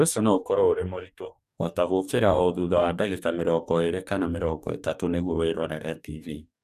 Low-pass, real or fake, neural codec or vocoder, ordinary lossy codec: 14.4 kHz; fake; codec, 44.1 kHz, 2.6 kbps, DAC; none